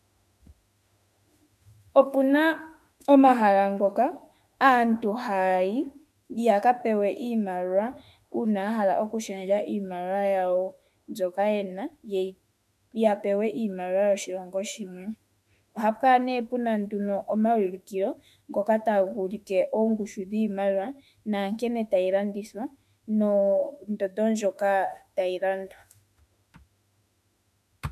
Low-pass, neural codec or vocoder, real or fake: 14.4 kHz; autoencoder, 48 kHz, 32 numbers a frame, DAC-VAE, trained on Japanese speech; fake